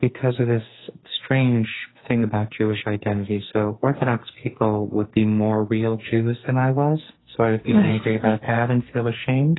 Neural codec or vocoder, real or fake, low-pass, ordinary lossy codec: codec, 44.1 kHz, 2.6 kbps, SNAC; fake; 7.2 kHz; AAC, 16 kbps